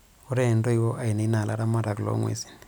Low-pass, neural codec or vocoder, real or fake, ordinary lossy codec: none; none; real; none